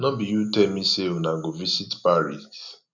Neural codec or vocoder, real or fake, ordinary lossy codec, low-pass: none; real; none; 7.2 kHz